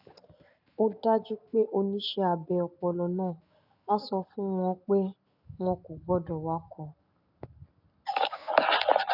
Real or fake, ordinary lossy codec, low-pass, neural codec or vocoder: real; none; 5.4 kHz; none